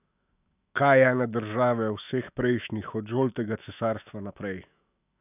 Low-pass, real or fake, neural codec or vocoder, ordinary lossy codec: 3.6 kHz; fake; autoencoder, 48 kHz, 128 numbers a frame, DAC-VAE, trained on Japanese speech; none